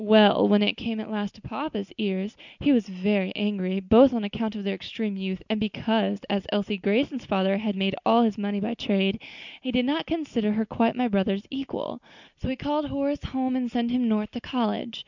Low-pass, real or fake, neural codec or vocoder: 7.2 kHz; real; none